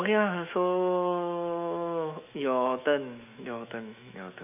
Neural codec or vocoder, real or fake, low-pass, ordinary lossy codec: autoencoder, 48 kHz, 128 numbers a frame, DAC-VAE, trained on Japanese speech; fake; 3.6 kHz; none